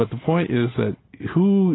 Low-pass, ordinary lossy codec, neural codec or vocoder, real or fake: 7.2 kHz; AAC, 16 kbps; none; real